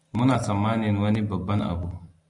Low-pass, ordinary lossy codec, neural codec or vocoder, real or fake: 10.8 kHz; AAC, 64 kbps; none; real